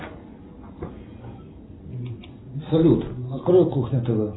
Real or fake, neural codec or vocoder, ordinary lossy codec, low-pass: real; none; AAC, 16 kbps; 7.2 kHz